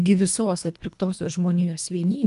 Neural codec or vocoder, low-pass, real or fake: codec, 24 kHz, 1.5 kbps, HILCodec; 10.8 kHz; fake